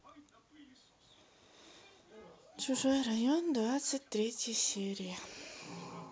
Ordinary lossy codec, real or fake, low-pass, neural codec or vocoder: none; real; none; none